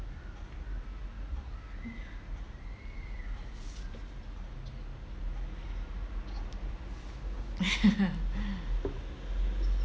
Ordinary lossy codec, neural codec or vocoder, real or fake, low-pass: none; none; real; none